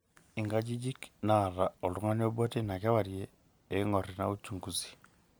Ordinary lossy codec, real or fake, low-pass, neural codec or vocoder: none; real; none; none